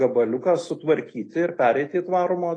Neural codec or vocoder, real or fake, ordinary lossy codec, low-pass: none; real; AAC, 32 kbps; 9.9 kHz